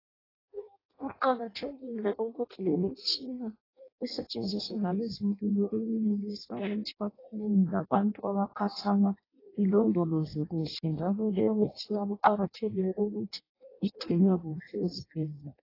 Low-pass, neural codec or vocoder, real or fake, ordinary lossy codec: 5.4 kHz; codec, 16 kHz in and 24 kHz out, 0.6 kbps, FireRedTTS-2 codec; fake; AAC, 24 kbps